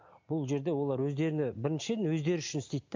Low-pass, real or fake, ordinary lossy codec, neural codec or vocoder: 7.2 kHz; real; MP3, 64 kbps; none